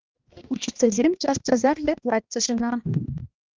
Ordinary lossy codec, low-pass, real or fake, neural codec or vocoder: Opus, 24 kbps; 7.2 kHz; fake; codec, 16 kHz, 1 kbps, X-Codec, HuBERT features, trained on balanced general audio